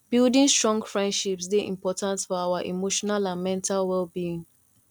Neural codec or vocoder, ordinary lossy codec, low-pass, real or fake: none; none; 19.8 kHz; real